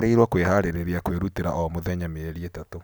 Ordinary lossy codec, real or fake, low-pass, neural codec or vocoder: none; real; none; none